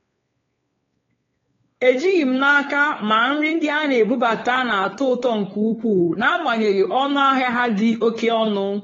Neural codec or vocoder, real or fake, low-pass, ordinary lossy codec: codec, 16 kHz, 4 kbps, X-Codec, WavLM features, trained on Multilingual LibriSpeech; fake; 7.2 kHz; AAC, 24 kbps